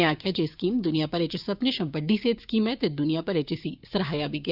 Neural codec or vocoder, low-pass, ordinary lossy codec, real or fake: codec, 16 kHz, 6 kbps, DAC; 5.4 kHz; Opus, 64 kbps; fake